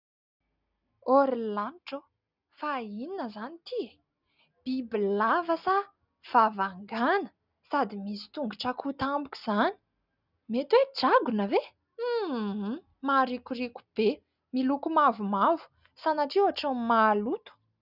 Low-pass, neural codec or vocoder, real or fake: 5.4 kHz; none; real